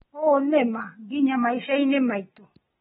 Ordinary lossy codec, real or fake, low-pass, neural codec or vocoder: AAC, 16 kbps; real; 19.8 kHz; none